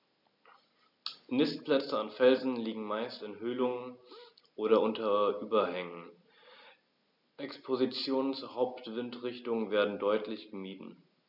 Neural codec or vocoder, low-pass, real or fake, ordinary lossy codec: none; 5.4 kHz; real; none